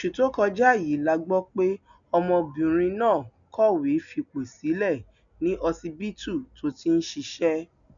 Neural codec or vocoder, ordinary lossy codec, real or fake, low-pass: none; none; real; 7.2 kHz